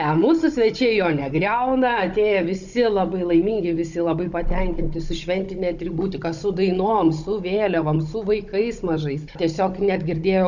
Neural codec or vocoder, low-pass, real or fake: codec, 16 kHz, 16 kbps, FunCodec, trained on Chinese and English, 50 frames a second; 7.2 kHz; fake